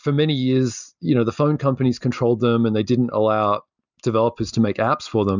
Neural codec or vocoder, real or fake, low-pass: none; real; 7.2 kHz